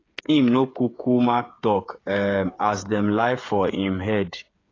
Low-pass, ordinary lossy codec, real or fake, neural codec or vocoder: 7.2 kHz; AAC, 32 kbps; fake; codec, 16 kHz, 8 kbps, FreqCodec, smaller model